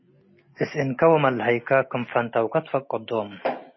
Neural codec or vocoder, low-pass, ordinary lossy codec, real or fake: none; 7.2 kHz; MP3, 24 kbps; real